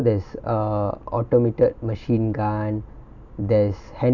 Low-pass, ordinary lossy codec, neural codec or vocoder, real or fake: 7.2 kHz; none; none; real